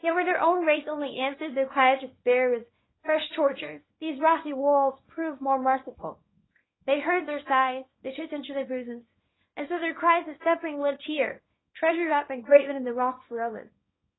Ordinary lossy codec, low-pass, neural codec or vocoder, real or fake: AAC, 16 kbps; 7.2 kHz; codec, 24 kHz, 0.9 kbps, WavTokenizer, small release; fake